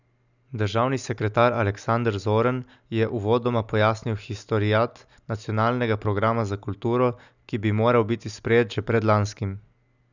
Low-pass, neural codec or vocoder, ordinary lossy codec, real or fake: 7.2 kHz; none; none; real